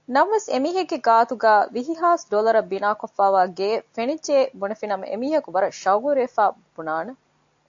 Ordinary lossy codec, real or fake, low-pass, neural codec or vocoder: AAC, 64 kbps; real; 7.2 kHz; none